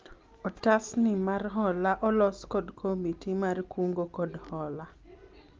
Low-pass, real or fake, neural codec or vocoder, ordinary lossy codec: 7.2 kHz; real; none; Opus, 24 kbps